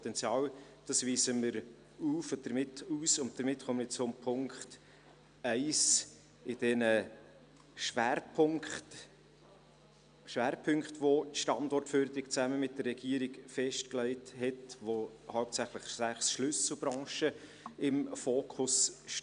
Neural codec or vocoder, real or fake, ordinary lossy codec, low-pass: none; real; none; 9.9 kHz